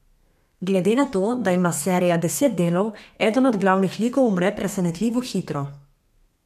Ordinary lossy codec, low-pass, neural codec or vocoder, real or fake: none; 14.4 kHz; codec, 32 kHz, 1.9 kbps, SNAC; fake